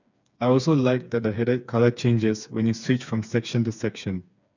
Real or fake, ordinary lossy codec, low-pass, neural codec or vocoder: fake; none; 7.2 kHz; codec, 16 kHz, 4 kbps, FreqCodec, smaller model